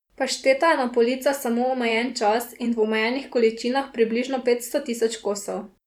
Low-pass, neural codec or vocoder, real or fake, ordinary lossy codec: 19.8 kHz; vocoder, 44.1 kHz, 128 mel bands every 512 samples, BigVGAN v2; fake; none